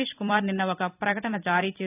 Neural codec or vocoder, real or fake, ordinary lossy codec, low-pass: vocoder, 44.1 kHz, 128 mel bands every 256 samples, BigVGAN v2; fake; none; 3.6 kHz